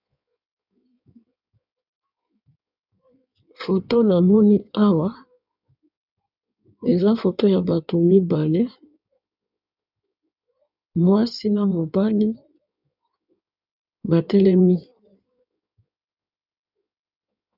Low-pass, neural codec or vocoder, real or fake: 5.4 kHz; codec, 16 kHz in and 24 kHz out, 1.1 kbps, FireRedTTS-2 codec; fake